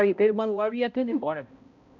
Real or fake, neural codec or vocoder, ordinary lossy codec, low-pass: fake; codec, 16 kHz, 0.5 kbps, X-Codec, HuBERT features, trained on balanced general audio; none; 7.2 kHz